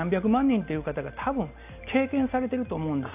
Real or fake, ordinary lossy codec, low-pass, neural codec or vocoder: real; none; 3.6 kHz; none